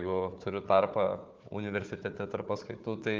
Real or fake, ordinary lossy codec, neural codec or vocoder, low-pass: fake; Opus, 24 kbps; codec, 16 kHz, 4 kbps, FunCodec, trained on Chinese and English, 50 frames a second; 7.2 kHz